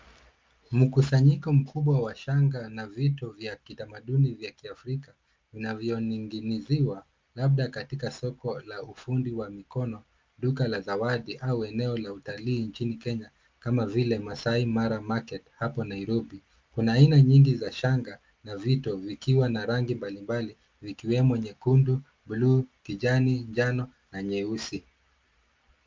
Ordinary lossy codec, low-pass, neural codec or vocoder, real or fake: Opus, 32 kbps; 7.2 kHz; none; real